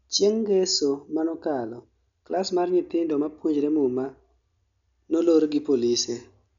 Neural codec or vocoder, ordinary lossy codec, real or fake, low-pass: none; none; real; 7.2 kHz